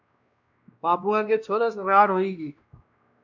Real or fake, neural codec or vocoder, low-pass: fake; codec, 16 kHz, 1 kbps, X-Codec, WavLM features, trained on Multilingual LibriSpeech; 7.2 kHz